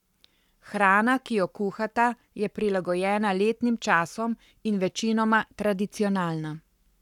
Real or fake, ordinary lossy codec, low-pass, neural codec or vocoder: fake; none; 19.8 kHz; codec, 44.1 kHz, 7.8 kbps, Pupu-Codec